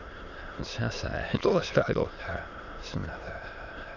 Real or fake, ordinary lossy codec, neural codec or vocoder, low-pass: fake; none; autoencoder, 22.05 kHz, a latent of 192 numbers a frame, VITS, trained on many speakers; 7.2 kHz